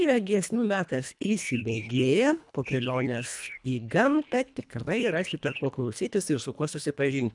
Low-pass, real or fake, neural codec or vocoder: 10.8 kHz; fake; codec, 24 kHz, 1.5 kbps, HILCodec